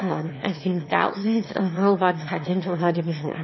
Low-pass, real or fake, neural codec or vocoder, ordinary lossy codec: 7.2 kHz; fake; autoencoder, 22.05 kHz, a latent of 192 numbers a frame, VITS, trained on one speaker; MP3, 24 kbps